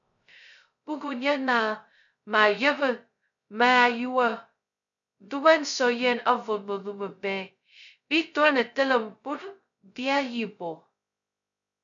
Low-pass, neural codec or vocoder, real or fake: 7.2 kHz; codec, 16 kHz, 0.2 kbps, FocalCodec; fake